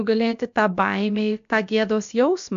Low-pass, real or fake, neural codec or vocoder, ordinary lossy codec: 7.2 kHz; fake; codec, 16 kHz, about 1 kbps, DyCAST, with the encoder's durations; AAC, 64 kbps